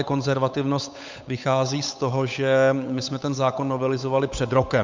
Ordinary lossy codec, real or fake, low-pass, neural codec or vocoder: MP3, 64 kbps; fake; 7.2 kHz; codec, 16 kHz, 8 kbps, FunCodec, trained on Chinese and English, 25 frames a second